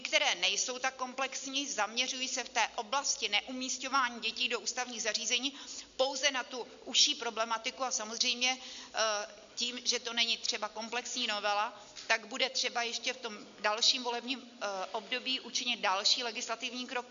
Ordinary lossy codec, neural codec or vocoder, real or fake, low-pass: MP3, 64 kbps; none; real; 7.2 kHz